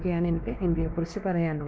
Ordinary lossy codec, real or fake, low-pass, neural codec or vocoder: none; fake; none; codec, 16 kHz, 2 kbps, X-Codec, WavLM features, trained on Multilingual LibriSpeech